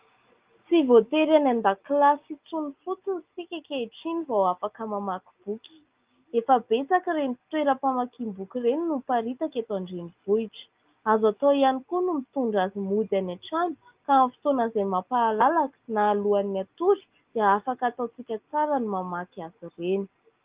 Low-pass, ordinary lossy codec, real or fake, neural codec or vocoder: 3.6 kHz; Opus, 32 kbps; real; none